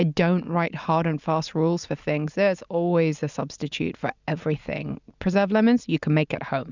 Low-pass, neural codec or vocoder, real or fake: 7.2 kHz; none; real